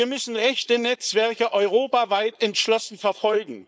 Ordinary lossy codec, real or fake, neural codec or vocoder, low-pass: none; fake; codec, 16 kHz, 4.8 kbps, FACodec; none